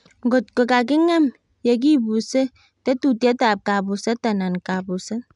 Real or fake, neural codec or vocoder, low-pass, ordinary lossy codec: real; none; 10.8 kHz; none